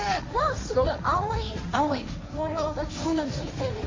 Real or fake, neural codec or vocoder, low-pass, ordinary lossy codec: fake; codec, 16 kHz, 1.1 kbps, Voila-Tokenizer; 7.2 kHz; MP3, 32 kbps